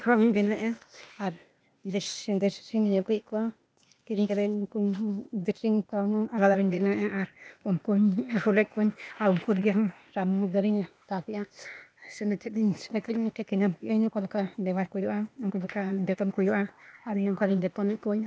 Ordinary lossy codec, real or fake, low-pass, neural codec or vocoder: none; fake; none; codec, 16 kHz, 0.8 kbps, ZipCodec